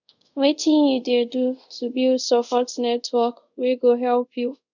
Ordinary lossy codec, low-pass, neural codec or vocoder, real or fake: none; 7.2 kHz; codec, 24 kHz, 0.5 kbps, DualCodec; fake